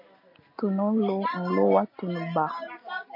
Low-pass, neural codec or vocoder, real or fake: 5.4 kHz; none; real